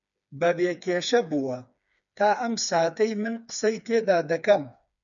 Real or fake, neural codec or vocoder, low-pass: fake; codec, 16 kHz, 4 kbps, FreqCodec, smaller model; 7.2 kHz